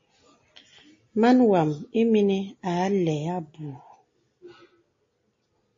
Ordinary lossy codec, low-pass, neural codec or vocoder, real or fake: MP3, 32 kbps; 7.2 kHz; none; real